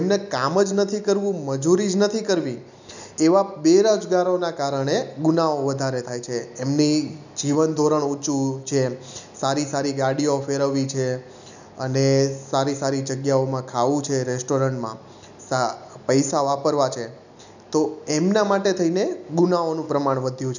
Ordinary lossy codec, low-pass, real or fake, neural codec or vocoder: none; 7.2 kHz; real; none